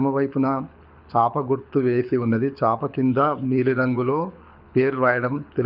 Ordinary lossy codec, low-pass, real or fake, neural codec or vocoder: none; 5.4 kHz; fake; codec, 24 kHz, 6 kbps, HILCodec